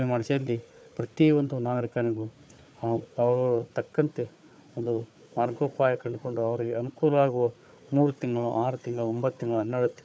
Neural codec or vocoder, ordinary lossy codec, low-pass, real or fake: codec, 16 kHz, 4 kbps, FunCodec, trained on Chinese and English, 50 frames a second; none; none; fake